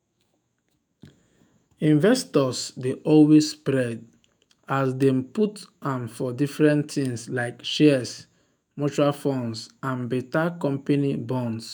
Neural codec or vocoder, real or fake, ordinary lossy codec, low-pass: autoencoder, 48 kHz, 128 numbers a frame, DAC-VAE, trained on Japanese speech; fake; none; none